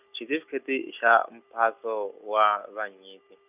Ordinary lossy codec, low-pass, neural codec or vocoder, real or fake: none; 3.6 kHz; none; real